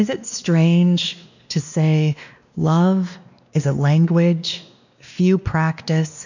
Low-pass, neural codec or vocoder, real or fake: 7.2 kHz; codec, 16 kHz, 2 kbps, X-Codec, WavLM features, trained on Multilingual LibriSpeech; fake